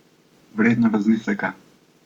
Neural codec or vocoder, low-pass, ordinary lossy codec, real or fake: codec, 44.1 kHz, 7.8 kbps, Pupu-Codec; 19.8 kHz; Opus, 64 kbps; fake